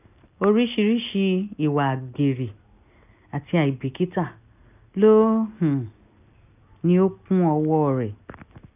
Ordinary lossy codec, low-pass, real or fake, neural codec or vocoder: none; 3.6 kHz; real; none